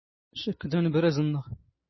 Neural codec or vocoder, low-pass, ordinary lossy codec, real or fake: none; 7.2 kHz; MP3, 24 kbps; real